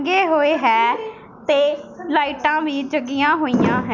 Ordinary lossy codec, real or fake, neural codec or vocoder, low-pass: none; real; none; 7.2 kHz